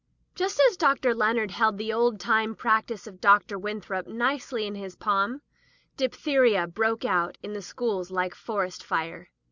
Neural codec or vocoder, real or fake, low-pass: none; real; 7.2 kHz